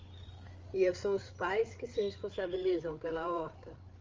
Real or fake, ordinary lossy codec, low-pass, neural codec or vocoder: fake; Opus, 32 kbps; 7.2 kHz; codec, 16 kHz, 8 kbps, FreqCodec, larger model